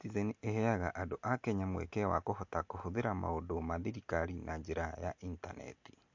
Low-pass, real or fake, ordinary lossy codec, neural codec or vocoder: 7.2 kHz; real; MP3, 48 kbps; none